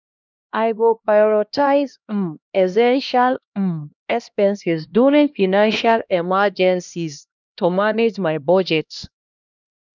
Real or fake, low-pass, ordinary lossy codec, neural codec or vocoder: fake; 7.2 kHz; none; codec, 16 kHz, 1 kbps, X-Codec, HuBERT features, trained on LibriSpeech